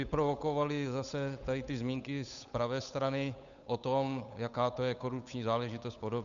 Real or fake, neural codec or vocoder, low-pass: fake; codec, 16 kHz, 8 kbps, FunCodec, trained on Chinese and English, 25 frames a second; 7.2 kHz